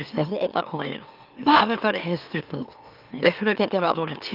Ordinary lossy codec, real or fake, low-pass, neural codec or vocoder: Opus, 24 kbps; fake; 5.4 kHz; autoencoder, 44.1 kHz, a latent of 192 numbers a frame, MeloTTS